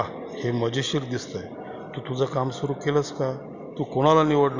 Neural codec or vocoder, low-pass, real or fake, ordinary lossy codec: none; 7.2 kHz; real; Opus, 64 kbps